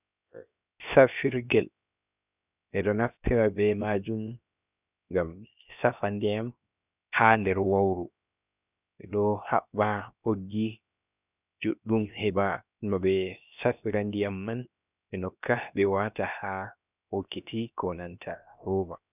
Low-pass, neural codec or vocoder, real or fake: 3.6 kHz; codec, 16 kHz, 0.7 kbps, FocalCodec; fake